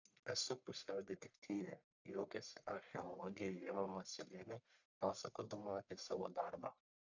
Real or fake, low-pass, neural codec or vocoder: fake; 7.2 kHz; codec, 44.1 kHz, 1.7 kbps, Pupu-Codec